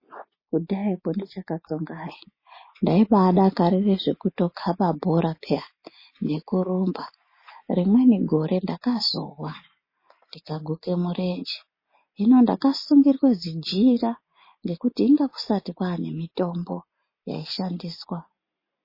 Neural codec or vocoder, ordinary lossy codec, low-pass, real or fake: none; MP3, 24 kbps; 5.4 kHz; real